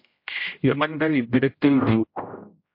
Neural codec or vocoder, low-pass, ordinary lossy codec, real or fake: codec, 16 kHz, 0.5 kbps, X-Codec, HuBERT features, trained on general audio; 5.4 kHz; MP3, 32 kbps; fake